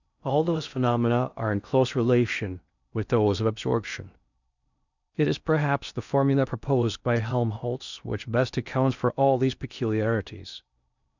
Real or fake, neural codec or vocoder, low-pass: fake; codec, 16 kHz in and 24 kHz out, 0.6 kbps, FocalCodec, streaming, 4096 codes; 7.2 kHz